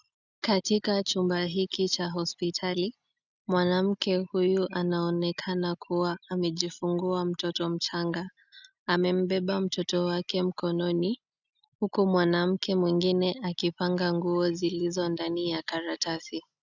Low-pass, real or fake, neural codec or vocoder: 7.2 kHz; real; none